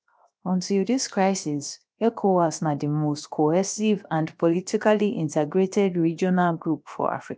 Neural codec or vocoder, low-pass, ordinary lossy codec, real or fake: codec, 16 kHz, 0.7 kbps, FocalCodec; none; none; fake